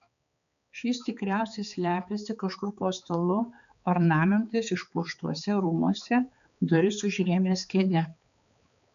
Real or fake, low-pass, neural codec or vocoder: fake; 7.2 kHz; codec, 16 kHz, 4 kbps, X-Codec, HuBERT features, trained on balanced general audio